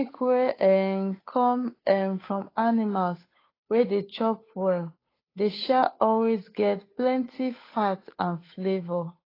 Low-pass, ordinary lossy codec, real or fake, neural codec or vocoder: 5.4 kHz; AAC, 24 kbps; fake; codec, 16 kHz, 8 kbps, FunCodec, trained on Chinese and English, 25 frames a second